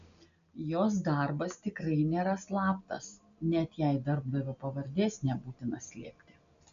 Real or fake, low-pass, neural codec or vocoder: real; 7.2 kHz; none